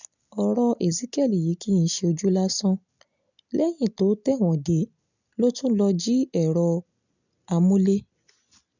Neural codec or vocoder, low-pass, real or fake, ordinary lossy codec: none; 7.2 kHz; real; none